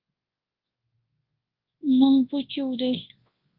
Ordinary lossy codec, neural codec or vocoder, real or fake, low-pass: Opus, 32 kbps; codec, 24 kHz, 0.9 kbps, WavTokenizer, large speech release; fake; 5.4 kHz